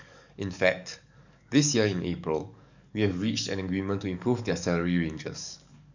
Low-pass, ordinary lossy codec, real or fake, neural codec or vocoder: 7.2 kHz; none; fake; codec, 44.1 kHz, 7.8 kbps, DAC